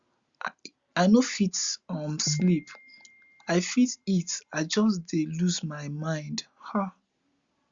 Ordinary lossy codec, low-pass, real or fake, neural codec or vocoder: Opus, 64 kbps; 7.2 kHz; real; none